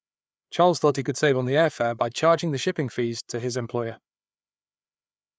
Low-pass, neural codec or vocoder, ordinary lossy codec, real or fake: none; codec, 16 kHz, 4 kbps, FreqCodec, larger model; none; fake